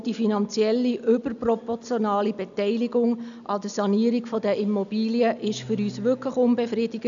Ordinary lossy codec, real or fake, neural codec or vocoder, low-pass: MP3, 96 kbps; real; none; 7.2 kHz